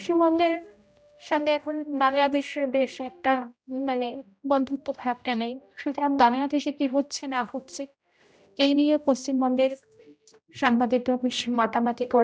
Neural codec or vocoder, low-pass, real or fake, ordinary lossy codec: codec, 16 kHz, 0.5 kbps, X-Codec, HuBERT features, trained on general audio; none; fake; none